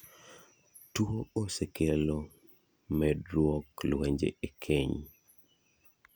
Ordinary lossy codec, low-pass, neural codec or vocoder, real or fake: none; none; none; real